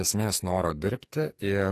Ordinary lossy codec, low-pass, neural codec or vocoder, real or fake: AAC, 48 kbps; 14.4 kHz; codec, 44.1 kHz, 2.6 kbps, SNAC; fake